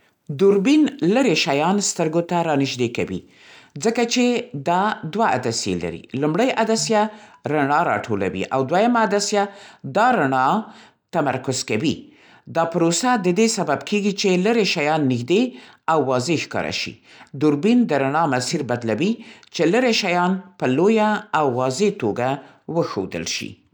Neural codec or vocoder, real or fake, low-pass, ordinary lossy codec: none; real; 19.8 kHz; none